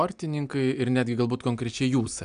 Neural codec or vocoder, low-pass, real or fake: none; 9.9 kHz; real